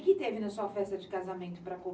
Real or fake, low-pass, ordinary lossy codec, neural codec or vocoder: real; none; none; none